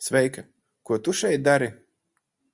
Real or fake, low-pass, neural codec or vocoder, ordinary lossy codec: real; 10.8 kHz; none; Opus, 64 kbps